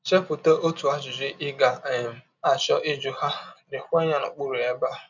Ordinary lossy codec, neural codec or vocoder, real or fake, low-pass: none; none; real; 7.2 kHz